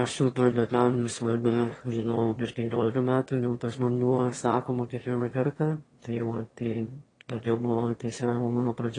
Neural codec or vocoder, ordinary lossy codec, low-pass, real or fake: autoencoder, 22.05 kHz, a latent of 192 numbers a frame, VITS, trained on one speaker; AAC, 32 kbps; 9.9 kHz; fake